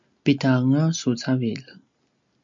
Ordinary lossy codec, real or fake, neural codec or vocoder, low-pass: MP3, 64 kbps; real; none; 7.2 kHz